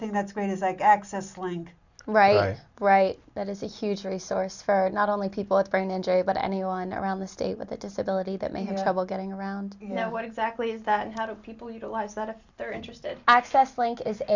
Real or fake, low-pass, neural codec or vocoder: real; 7.2 kHz; none